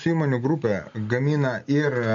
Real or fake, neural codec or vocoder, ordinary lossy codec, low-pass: real; none; MP3, 48 kbps; 7.2 kHz